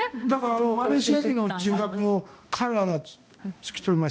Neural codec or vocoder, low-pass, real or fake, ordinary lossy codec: codec, 16 kHz, 1 kbps, X-Codec, HuBERT features, trained on balanced general audio; none; fake; none